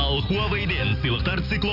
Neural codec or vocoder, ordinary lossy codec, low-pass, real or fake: none; none; 5.4 kHz; real